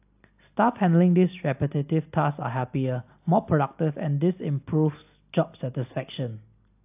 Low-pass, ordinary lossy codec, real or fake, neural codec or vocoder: 3.6 kHz; AAC, 32 kbps; real; none